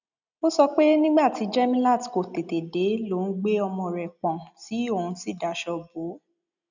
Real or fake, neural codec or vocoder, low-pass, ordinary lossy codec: real; none; 7.2 kHz; none